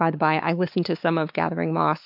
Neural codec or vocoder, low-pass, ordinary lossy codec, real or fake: codec, 16 kHz, 4 kbps, X-Codec, WavLM features, trained on Multilingual LibriSpeech; 5.4 kHz; MP3, 48 kbps; fake